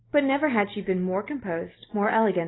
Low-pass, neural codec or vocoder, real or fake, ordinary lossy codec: 7.2 kHz; none; real; AAC, 16 kbps